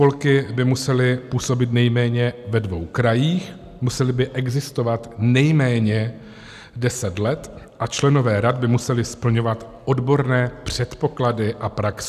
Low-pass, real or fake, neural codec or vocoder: 14.4 kHz; real; none